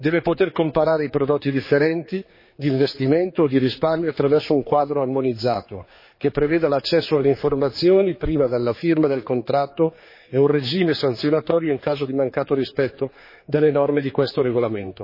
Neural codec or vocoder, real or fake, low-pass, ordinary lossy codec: codec, 16 kHz, 4 kbps, X-Codec, HuBERT features, trained on general audio; fake; 5.4 kHz; MP3, 24 kbps